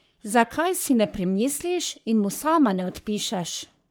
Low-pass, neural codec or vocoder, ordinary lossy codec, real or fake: none; codec, 44.1 kHz, 3.4 kbps, Pupu-Codec; none; fake